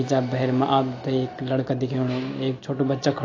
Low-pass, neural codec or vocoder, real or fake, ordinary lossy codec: 7.2 kHz; none; real; AAC, 32 kbps